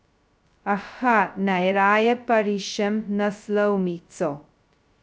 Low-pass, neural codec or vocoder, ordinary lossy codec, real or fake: none; codec, 16 kHz, 0.2 kbps, FocalCodec; none; fake